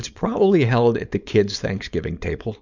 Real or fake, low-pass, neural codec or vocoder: fake; 7.2 kHz; codec, 16 kHz, 4.8 kbps, FACodec